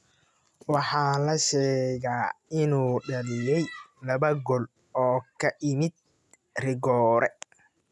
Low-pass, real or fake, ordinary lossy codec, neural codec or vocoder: none; real; none; none